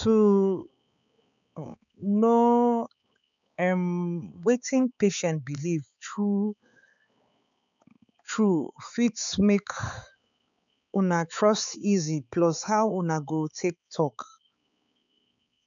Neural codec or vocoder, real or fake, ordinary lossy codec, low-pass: codec, 16 kHz, 4 kbps, X-Codec, HuBERT features, trained on balanced general audio; fake; none; 7.2 kHz